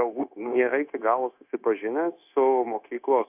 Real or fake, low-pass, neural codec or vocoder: fake; 3.6 kHz; codec, 16 kHz, 0.9 kbps, LongCat-Audio-Codec